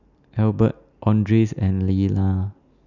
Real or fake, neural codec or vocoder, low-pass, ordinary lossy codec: real; none; 7.2 kHz; none